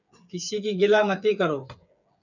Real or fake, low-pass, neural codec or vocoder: fake; 7.2 kHz; codec, 16 kHz, 8 kbps, FreqCodec, smaller model